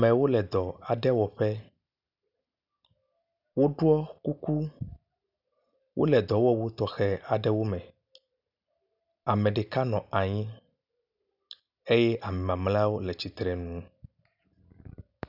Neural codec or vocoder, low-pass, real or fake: none; 5.4 kHz; real